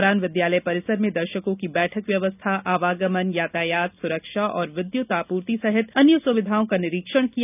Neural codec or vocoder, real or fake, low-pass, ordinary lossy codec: none; real; 3.6 kHz; none